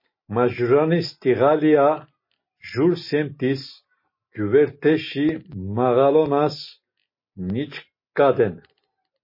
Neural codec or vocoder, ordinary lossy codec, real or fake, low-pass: none; MP3, 24 kbps; real; 5.4 kHz